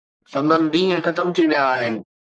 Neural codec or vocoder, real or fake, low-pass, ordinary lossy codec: codec, 44.1 kHz, 1.7 kbps, Pupu-Codec; fake; 9.9 kHz; MP3, 96 kbps